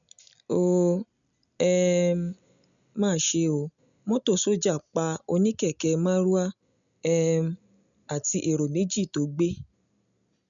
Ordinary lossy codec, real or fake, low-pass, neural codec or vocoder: MP3, 96 kbps; real; 7.2 kHz; none